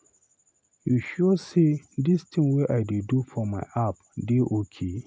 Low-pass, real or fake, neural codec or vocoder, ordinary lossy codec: none; real; none; none